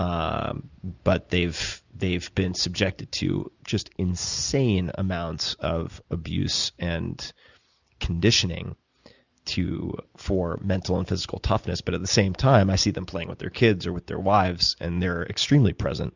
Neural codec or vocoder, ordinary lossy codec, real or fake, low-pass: none; Opus, 64 kbps; real; 7.2 kHz